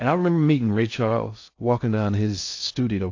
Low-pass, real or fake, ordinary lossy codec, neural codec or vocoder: 7.2 kHz; fake; AAC, 48 kbps; codec, 16 kHz in and 24 kHz out, 0.6 kbps, FocalCodec, streaming, 2048 codes